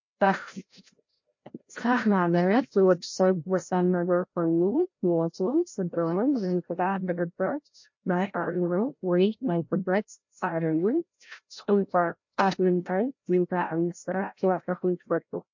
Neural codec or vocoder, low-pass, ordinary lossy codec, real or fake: codec, 16 kHz, 0.5 kbps, FreqCodec, larger model; 7.2 kHz; MP3, 48 kbps; fake